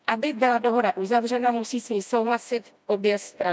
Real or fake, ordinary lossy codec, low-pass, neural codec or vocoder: fake; none; none; codec, 16 kHz, 1 kbps, FreqCodec, smaller model